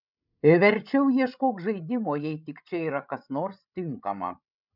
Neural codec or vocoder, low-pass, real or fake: codec, 16 kHz, 16 kbps, FreqCodec, larger model; 5.4 kHz; fake